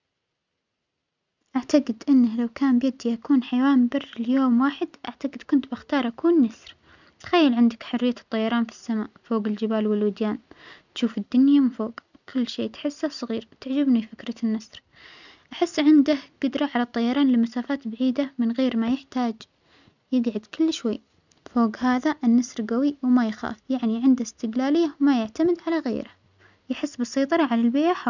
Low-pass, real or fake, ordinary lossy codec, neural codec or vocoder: 7.2 kHz; real; none; none